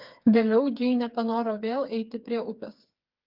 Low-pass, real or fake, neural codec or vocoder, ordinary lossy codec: 5.4 kHz; fake; codec, 16 kHz, 4 kbps, FreqCodec, smaller model; Opus, 32 kbps